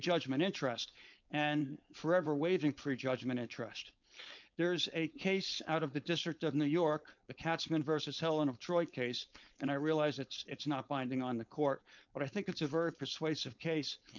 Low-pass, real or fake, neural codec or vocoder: 7.2 kHz; fake; codec, 16 kHz, 4.8 kbps, FACodec